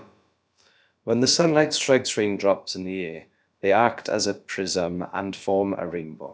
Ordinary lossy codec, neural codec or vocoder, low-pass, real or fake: none; codec, 16 kHz, about 1 kbps, DyCAST, with the encoder's durations; none; fake